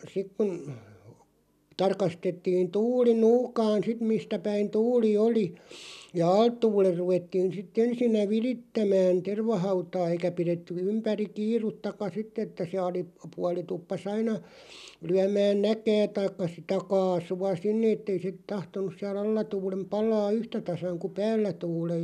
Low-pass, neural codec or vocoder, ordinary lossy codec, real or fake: 14.4 kHz; none; none; real